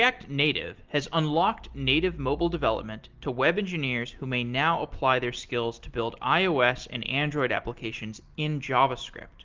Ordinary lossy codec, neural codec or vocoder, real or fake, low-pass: Opus, 32 kbps; none; real; 7.2 kHz